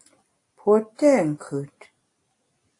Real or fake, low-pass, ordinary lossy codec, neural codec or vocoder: real; 10.8 kHz; AAC, 48 kbps; none